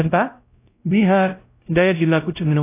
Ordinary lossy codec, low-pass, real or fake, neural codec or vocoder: AAC, 24 kbps; 3.6 kHz; fake; codec, 16 kHz, 0.5 kbps, X-Codec, WavLM features, trained on Multilingual LibriSpeech